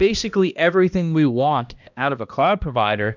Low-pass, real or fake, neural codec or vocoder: 7.2 kHz; fake; codec, 16 kHz, 1 kbps, X-Codec, HuBERT features, trained on balanced general audio